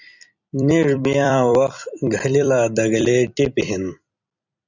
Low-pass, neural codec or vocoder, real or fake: 7.2 kHz; vocoder, 44.1 kHz, 128 mel bands every 256 samples, BigVGAN v2; fake